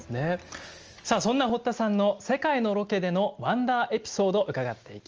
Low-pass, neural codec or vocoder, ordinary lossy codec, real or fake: 7.2 kHz; none; Opus, 24 kbps; real